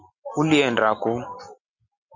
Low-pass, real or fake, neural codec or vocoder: 7.2 kHz; real; none